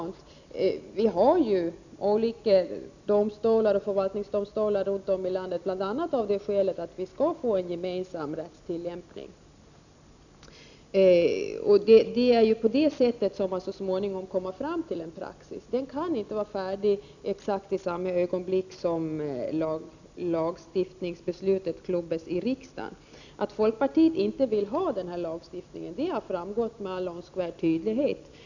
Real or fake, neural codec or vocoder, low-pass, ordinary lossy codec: real; none; 7.2 kHz; none